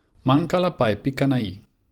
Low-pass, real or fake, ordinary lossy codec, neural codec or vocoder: 19.8 kHz; fake; Opus, 32 kbps; vocoder, 44.1 kHz, 128 mel bands every 512 samples, BigVGAN v2